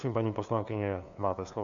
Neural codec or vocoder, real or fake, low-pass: codec, 16 kHz, 2 kbps, FunCodec, trained on LibriTTS, 25 frames a second; fake; 7.2 kHz